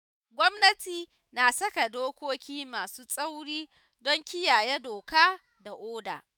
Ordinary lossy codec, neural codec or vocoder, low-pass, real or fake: none; autoencoder, 48 kHz, 128 numbers a frame, DAC-VAE, trained on Japanese speech; none; fake